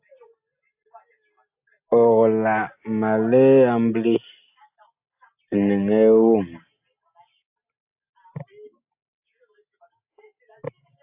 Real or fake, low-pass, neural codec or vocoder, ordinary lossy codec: real; 3.6 kHz; none; AAC, 32 kbps